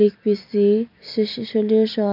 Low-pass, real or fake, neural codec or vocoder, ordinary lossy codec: 5.4 kHz; real; none; none